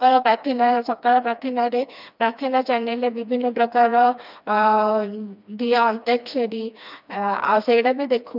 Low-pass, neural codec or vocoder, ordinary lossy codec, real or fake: 5.4 kHz; codec, 16 kHz, 2 kbps, FreqCodec, smaller model; none; fake